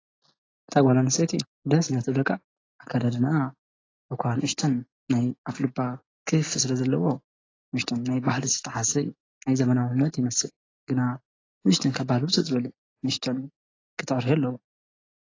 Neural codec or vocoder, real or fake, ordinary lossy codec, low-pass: none; real; AAC, 32 kbps; 7.2 kHz